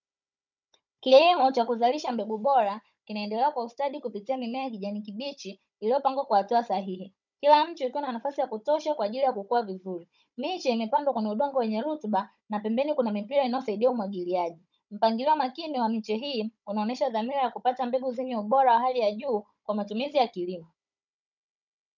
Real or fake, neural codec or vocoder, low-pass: fake; codec, 16 kHz, 16 kbps, FunCodec, trained on Chinese and English, 50 frames a second; 7.2 kHz